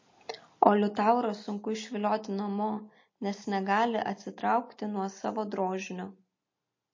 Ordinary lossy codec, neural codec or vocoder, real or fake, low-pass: MP3, 32 kbps; none; real; 7.2 kHz